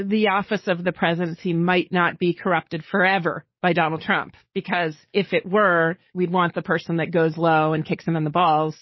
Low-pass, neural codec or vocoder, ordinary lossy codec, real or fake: 7.2 kHz; codec, 44.1 kHz, 7.8 kbps, DAC; MP3, 24 kbps; fake